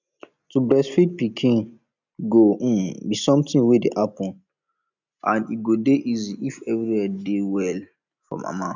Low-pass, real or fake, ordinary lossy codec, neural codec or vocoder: 7.2 kHz; real; none; none